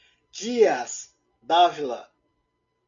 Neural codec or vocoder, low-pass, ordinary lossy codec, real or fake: none; 7.2 kHz; MP3, 64 kbps; real